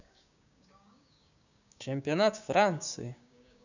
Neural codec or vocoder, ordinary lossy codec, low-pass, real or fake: codec, 16 kHz, 6 kbps, DAC; none; 7.2 kHz; fake